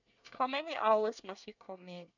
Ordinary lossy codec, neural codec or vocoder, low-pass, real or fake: none; codec, 24 kHz, 1 kbps, SNAC; 7.2 kHz; fake